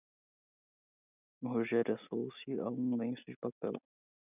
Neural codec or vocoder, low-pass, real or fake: none; 3.6 kHz; real